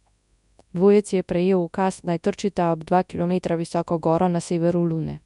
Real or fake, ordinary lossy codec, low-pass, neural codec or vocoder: fake; none; 10.8 kHz; codec, 24 kHz, 0.9 kbps, WavTokenizer, large speech release